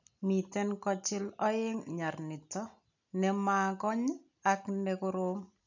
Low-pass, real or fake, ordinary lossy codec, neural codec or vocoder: 7.2 kHz; real; none; none